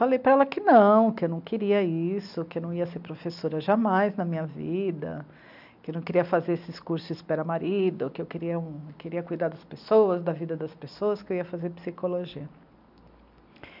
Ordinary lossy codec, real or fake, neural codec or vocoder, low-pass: none; real; none; 5.4 kHz